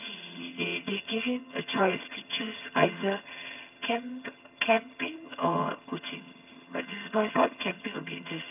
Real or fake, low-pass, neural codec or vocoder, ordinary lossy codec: fake; 3.6 kHz; vocoder, 22.05 kHz, 80 mel bands, HiFi-GAN; none